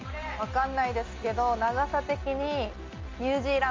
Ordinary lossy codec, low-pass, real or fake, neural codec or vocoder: Opus, 32 kbps; 7.2 kHz; real; none